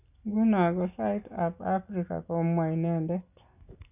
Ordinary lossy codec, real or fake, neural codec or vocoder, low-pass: none; real; none; 3.6 kHz